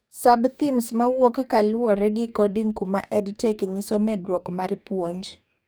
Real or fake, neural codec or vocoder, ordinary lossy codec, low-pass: fake; codec, 44.1 kHz, 2.6 kbps, DAC; none; none